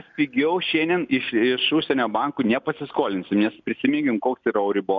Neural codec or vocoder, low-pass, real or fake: none; 7.2 kHz; real